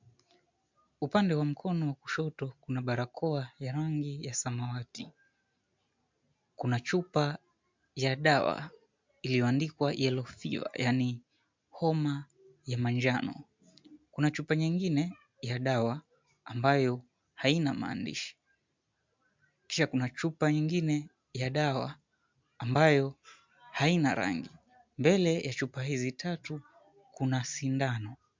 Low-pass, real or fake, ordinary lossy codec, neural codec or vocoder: 7.2 kHz; real; MP3, 64 kbps; none